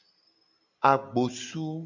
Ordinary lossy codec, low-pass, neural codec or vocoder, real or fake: AAC, 48 kbps; 7.2 kHz; none; real